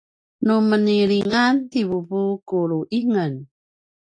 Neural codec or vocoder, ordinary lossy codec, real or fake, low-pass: none; AAC, 48 kbps; real; 9.9 kHz